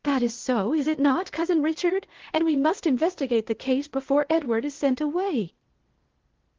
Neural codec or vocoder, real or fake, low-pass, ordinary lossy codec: codec, 16 kHz, 0.8 kbps, ZipCodec; fake; 7.2 kHz; Opus, 16 kbps